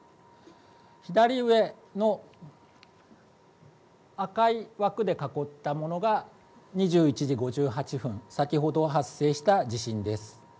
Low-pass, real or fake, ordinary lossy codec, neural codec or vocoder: none; real; none; none